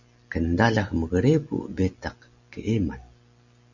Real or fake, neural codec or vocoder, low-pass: real; none; 7.2 kHz